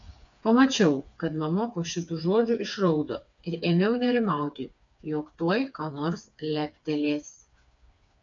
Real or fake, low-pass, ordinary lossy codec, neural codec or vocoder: fake; 7.2 kHz; AAC, 64 kbps; codec, 16 kHz, 4 kbps, FreqCodec, smaller model